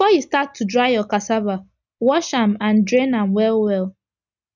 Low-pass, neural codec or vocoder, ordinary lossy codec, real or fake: 7.2 kHz; none; none; real